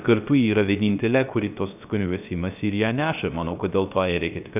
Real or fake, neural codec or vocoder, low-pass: fake; codec, 16 kHz, 0.3 kbps, FocalCodec; 3.6 kHz